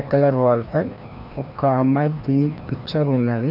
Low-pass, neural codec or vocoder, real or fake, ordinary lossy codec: 5.4 kHz; codec, 16 kHz, 1 kbps, FreqCodec, larger model; fake; none